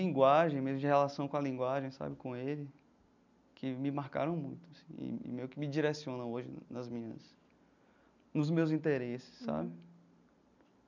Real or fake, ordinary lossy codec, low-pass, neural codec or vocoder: real; none; 7.2 kHz; none